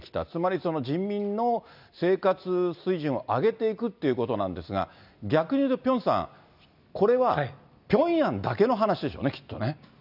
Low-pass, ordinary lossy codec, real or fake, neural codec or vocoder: 5.4 kHz; none; real; none